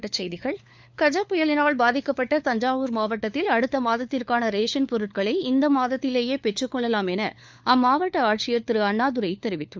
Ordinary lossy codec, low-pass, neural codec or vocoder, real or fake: none; none; codec, 16 kHz, 4 kbps, FunCodec, trained on LibriTTS, 50 frames a second; fake